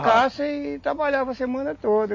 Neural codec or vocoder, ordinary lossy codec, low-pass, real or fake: none; MP3, 32 kbps; 7.2 kHz; real